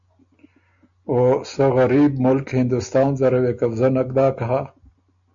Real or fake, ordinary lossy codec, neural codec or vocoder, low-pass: real; AAC, 64 kbps; none; 7.2 kHz